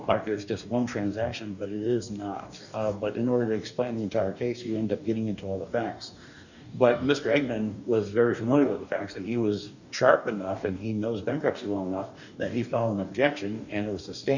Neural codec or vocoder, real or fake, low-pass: codec, 44.1 kHz, 2.6 kbps, DAC; fake; 7.2 kHz